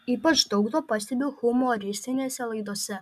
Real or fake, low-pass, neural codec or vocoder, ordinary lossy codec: real; 14.4 kHz; none; MP3, 96 kbps